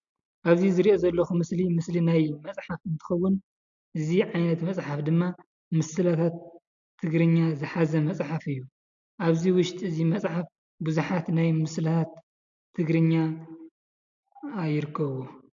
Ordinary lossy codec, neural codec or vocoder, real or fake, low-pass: AAC, 64 kbps; none; real; 7.2 kHz